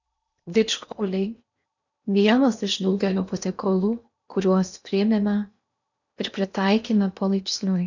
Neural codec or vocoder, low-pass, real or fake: codec, 16 kHz in and 24 kHz out, 0.8 kbps, FocalCodec, streaming, 65536 codes; 7.2 kHz; fake